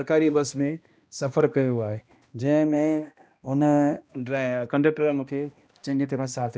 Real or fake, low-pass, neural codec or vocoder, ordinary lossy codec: fake; none; codec, 16 kHz, 1 kbps, X-Codec, HuBERT features, trained on balanced general audio; none